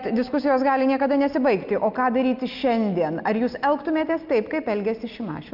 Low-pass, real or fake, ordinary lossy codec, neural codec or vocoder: 5.4 kHz; real; Opus, 24 kbps; none